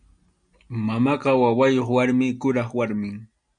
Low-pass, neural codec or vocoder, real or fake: 9.9 kHz; none; real